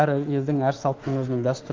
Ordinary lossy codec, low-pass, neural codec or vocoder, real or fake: Opus, 32 kbps; 7.2 kHz; autoencoder, 48 kHz, 32 numbers a frame, DAC-VAE, trained on Japanese speech; fake